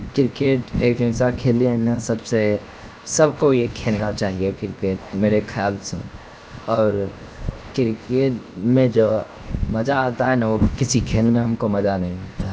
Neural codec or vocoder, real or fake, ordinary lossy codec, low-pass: codec, 16 kHz, 0.7 kbps, FocalCodec; fake; none; none